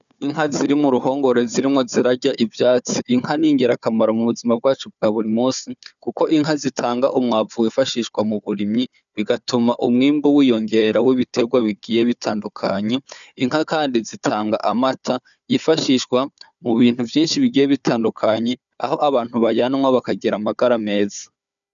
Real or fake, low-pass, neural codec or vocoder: fake; 7.2 kHz; codec, 16 kHz, 4 kbps, FunCodec, trained on Chinese and English, 50 frames a second